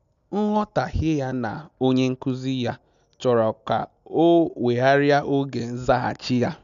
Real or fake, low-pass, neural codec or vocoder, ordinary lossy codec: real; 7.2 kHz; none; none